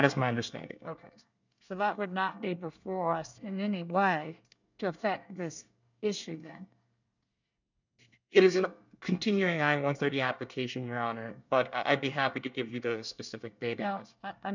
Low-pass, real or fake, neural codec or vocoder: 7.2 kHz; fake; codec, 24 kHz, 1 kbps, SNAC